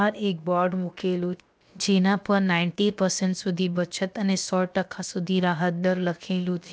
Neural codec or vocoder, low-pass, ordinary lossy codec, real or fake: codec, 16 kHz, about 1 kbps, DyCAST, with the encoder's durations; none; none; fake